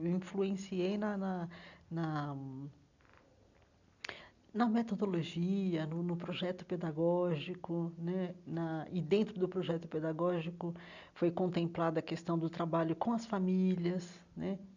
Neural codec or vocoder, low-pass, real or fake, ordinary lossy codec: none; 7.2 kHz; real; none